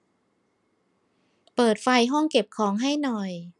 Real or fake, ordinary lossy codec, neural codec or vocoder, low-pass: real; none; none; 10.8 kHz